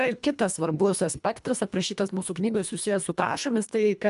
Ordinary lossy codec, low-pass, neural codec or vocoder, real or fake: MP3, 96 kbps; 10.8 kHz; codec, 24 kHz, 1.5 kbps, HILCodec; fake